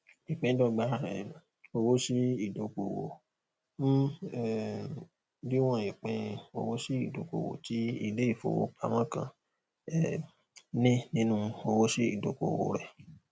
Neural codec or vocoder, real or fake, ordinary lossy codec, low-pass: none; real; none; none